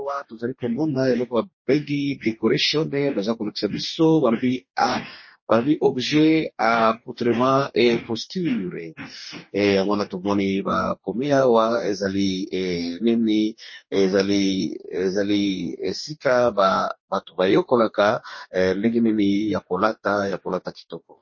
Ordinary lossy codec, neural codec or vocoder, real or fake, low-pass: MP3, 32 kbps; codec, 44.1 kHz, 2.6 kbps, DAC; fake; 7.2 kHz